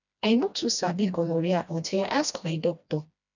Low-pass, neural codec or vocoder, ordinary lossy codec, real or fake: 7.2 kHz; codec, 16 kHz, 1 kbps, FreqCodec, smaller model; none; fake